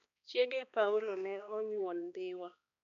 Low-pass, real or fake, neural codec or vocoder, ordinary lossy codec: 7.2 kHz; fake; codec, 16 kHz, 2 kbps, X-Codec, HuBERT features, trained on balanced general audio; none